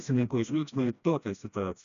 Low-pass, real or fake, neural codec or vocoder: 7.2 kHz; fake; codec, 16 kHz, 1 kbps, FreqCodec, smaller model